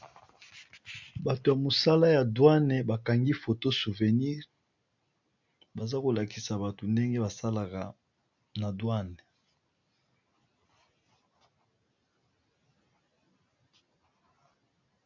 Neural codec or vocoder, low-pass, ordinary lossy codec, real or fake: none; 7.2 kHz; MP3, 48 kbps; real